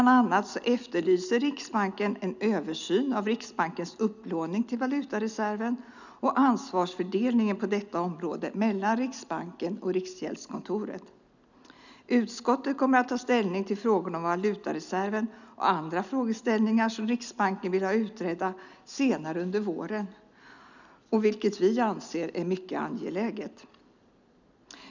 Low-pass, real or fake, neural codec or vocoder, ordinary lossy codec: 7.2 kHz; real; none; none